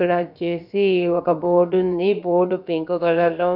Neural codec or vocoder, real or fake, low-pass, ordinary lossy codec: codec, 16 kHz, about 1 kbps, DyCAST, with the encoder's durations; fake; 5.4 kHz; none